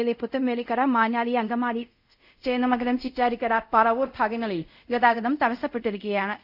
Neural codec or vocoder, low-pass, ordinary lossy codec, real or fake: codec, 24 kHz, 0.5 kbps, DualCodec; 5.4 kHz; none; fake